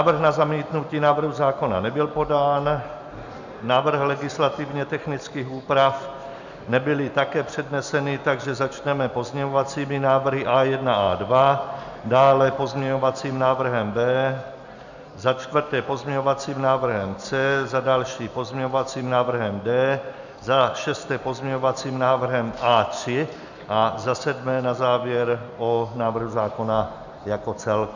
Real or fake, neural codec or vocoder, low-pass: real; none; 7.2 kHz